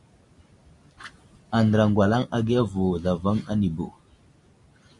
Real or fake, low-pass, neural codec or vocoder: real; 10.8 kHz; none